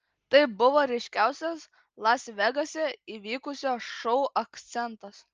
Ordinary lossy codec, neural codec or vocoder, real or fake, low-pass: Opus, 24 kbps; none; real; 7.2 kHz